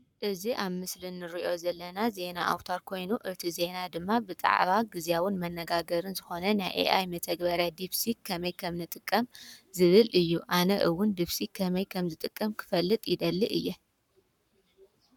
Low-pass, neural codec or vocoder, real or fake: 19.8 kHz; codec, 44.1 kHz, 7.8 kbps, Pupu-Codec; fake